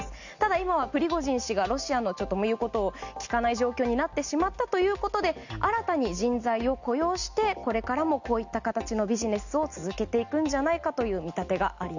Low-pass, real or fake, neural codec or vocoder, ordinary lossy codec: 7.2 kHz; real; none; none